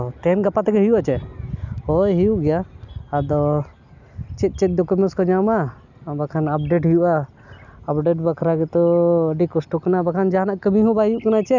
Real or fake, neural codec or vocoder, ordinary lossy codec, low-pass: real; none; none; 7.2 kHz